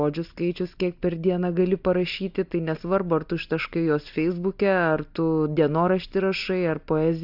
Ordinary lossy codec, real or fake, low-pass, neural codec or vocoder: AAC, 48 kbps; real; 5.4 kHz; none